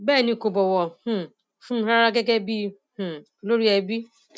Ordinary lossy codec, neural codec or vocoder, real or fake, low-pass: none; none; real; none